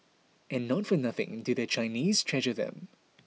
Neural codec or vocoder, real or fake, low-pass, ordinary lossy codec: none; real; none; none